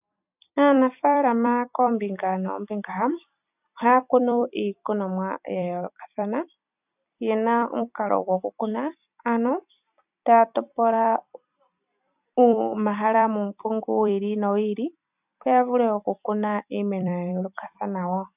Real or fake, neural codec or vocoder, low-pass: fake; vocoder, 44.1 kHz, 128 mel bands every 256 samples, BigVGAN v2; 3.6 kHz